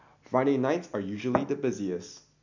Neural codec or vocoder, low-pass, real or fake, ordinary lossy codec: none; 7.2 kHz; real; none